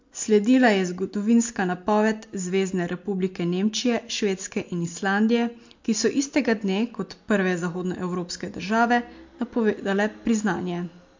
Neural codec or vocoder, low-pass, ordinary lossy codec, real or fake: none; 7.2 kHz; MP3, 48 kbps; real